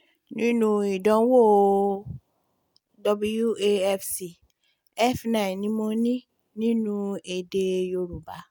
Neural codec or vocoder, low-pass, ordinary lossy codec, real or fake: none; none; none; real